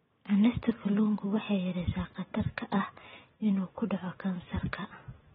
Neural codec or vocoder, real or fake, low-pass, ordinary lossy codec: none; real; 19.8 kHz; AAC, 16 kbps